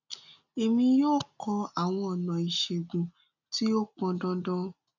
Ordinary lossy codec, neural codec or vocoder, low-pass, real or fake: none; none; 7.2 kHz; real